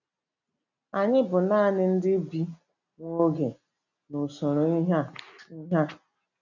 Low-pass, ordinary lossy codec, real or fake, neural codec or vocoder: 7.2 kHz; none; real; none